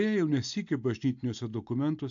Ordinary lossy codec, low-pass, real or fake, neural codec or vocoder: AAC, 64 kbps; 7.2 kHz; real; none